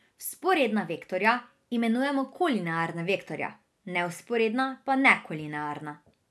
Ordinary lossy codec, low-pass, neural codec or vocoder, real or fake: none; none; none; real